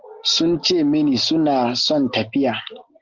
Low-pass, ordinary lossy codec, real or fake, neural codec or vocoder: 7.2 kHz; Opus, 24 kbps; real; none